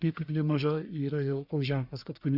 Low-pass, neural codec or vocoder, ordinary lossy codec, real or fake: 5.4 kHz; codec, 44.1 kHz, 1.7 kbps, Pupu-Codec; Opus, 64 kbps; fake